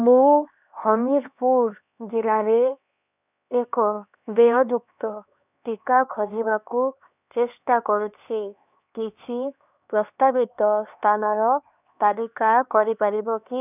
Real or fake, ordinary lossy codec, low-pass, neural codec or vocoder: fake; none; 3.6 kHz; codec, 16 kHz, 2 kbps, X-Codec, HuBERT features, trained on LibriSpeech